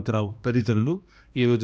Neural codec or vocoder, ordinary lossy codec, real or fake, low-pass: codec, 16 kHz, 1 kbps, X-Codec, HuBERT features, trained on balanced general audio; none; fake; none